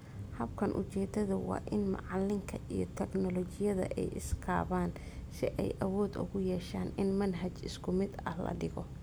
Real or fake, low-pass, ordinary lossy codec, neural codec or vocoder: real; none; none; none